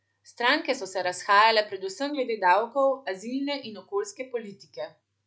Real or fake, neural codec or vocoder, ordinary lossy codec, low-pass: real; none; none; none